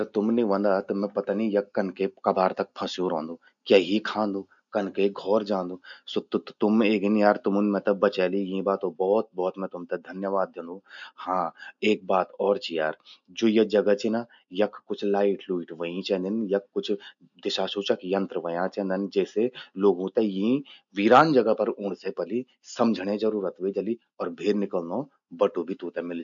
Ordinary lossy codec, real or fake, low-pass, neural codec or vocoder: none; real; 7.2 kHz; none